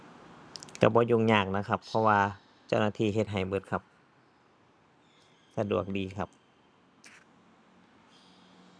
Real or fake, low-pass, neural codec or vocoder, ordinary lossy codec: real; none; none; none